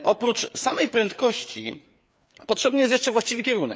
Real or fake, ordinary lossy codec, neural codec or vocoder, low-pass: fake; none; codec, 16 kHz, 4 kbps, FreqCodec, larger model; none